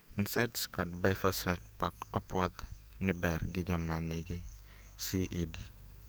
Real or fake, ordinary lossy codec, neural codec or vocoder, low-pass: fake; none; codec, 44.1 kHz, 2.6 kbps, SNAC; none